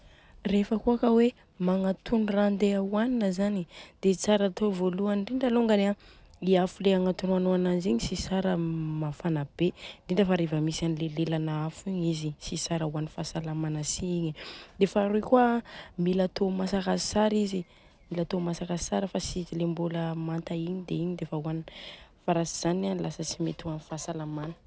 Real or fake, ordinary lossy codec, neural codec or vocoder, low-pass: real; none; none; none